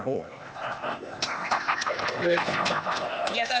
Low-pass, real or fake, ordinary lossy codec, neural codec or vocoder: none; fake; none; codec, 16 kHz, 0.8 kbps, ZipCodec